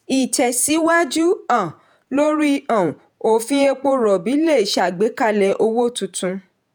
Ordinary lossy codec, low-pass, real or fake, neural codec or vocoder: none; none; fake; vocoder, 48 kHz, 128 mel bands, Vocos